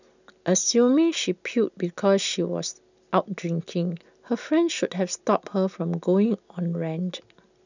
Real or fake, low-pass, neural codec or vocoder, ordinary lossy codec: real; 7.2 kHz; none; none